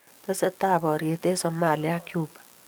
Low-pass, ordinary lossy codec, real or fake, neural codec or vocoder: none; none; fake; codec, 44.1 kHz, 7.8 kbps, DAC